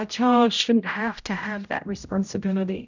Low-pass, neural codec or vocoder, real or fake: 7.2 kHz; codec, 16 kHz, 0.5 kbps, X-Codec, HuBERT features, trained on general audio; fake